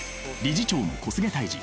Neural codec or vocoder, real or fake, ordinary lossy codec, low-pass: none; real; none; none